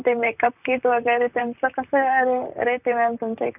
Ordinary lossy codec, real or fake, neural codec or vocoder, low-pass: none; fake; vocoder, 44.1 kHz, 128 mel bands, Pupu-Vocoder; 3.6 kHz